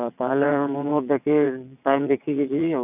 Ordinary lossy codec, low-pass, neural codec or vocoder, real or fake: none; 3.6 kHz; vocoder, 22.05 kHz, 80 mel bands, WaveNeXt; fake